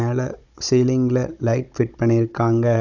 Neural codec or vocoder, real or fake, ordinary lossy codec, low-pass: codec, 16 kHz, 16 kbps, FunCodec, trained on Chinese and English, 50 frames a second; fake; none; 7.2 kHz